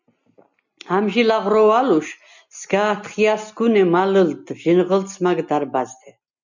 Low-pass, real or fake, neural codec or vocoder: 7.2 kHz; real; none